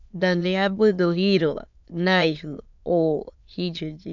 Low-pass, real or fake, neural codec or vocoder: 7.2 kHz; fake; autoencoder, 22.05 kHz, a latent of 192 numbers a frame, VITS, trained on many speakers